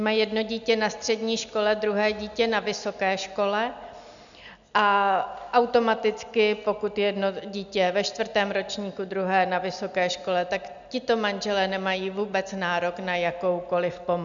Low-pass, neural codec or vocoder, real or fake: 7.2 kHz; none; real